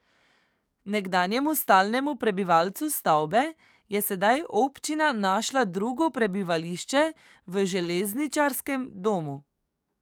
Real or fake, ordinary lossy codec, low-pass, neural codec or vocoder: fake; none; none; codec, 44.1 kHz, 7.8 kbps, DAC